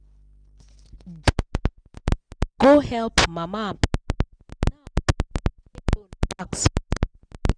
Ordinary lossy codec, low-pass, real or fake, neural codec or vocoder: none; 9.9 kHz; real; none